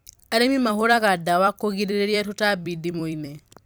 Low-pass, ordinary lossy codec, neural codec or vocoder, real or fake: none; none; vocoder, 44.1 kHz, 128 mel bands every 256 samples, BigVGAN v2; fake